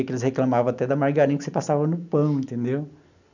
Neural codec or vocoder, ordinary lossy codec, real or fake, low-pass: none; none; real; 7.2 kHz